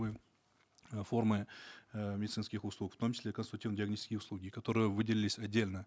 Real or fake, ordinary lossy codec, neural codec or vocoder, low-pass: real; none; none; none